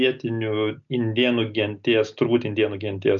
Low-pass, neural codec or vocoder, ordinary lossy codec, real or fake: 7.2 kHz; none; MP3, 64 kbps; real